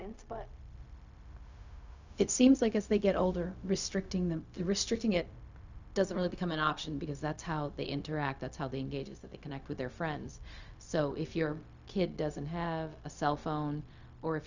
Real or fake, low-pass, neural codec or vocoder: fake; 7.2 kHz; codec, 16 kHz, 0.4 kbps, LongCat-Audio-Codec